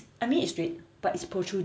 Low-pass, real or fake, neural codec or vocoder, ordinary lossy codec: none; real; none; none